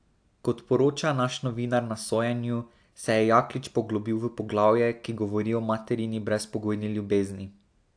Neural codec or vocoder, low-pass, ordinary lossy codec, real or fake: none; 9.9 kHz; AAC, 64 kbps; real